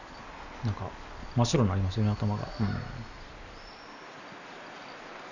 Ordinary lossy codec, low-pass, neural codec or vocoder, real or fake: none; 7.2 kHz; none; real